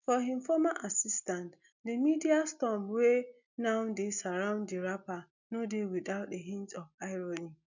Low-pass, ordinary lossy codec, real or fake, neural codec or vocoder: 7.2 kHz; none; real; none